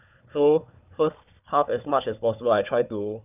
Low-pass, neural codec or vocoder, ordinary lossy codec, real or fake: 3.6 kHz; codec, 16 kHz, 4 kbps, FunCodec, trained on Chinese and English, 50 frames a second; none; fake